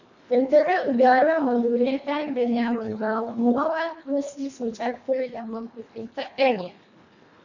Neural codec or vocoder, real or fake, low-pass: codec, 24 kHz, 1.5 kbps, HILCodec; fake; 7.2 kHz